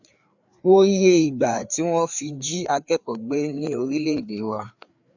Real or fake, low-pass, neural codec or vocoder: fake; 7.2 kHz; codec, 16 kHz, 4 kbps, FreqCodec, larger model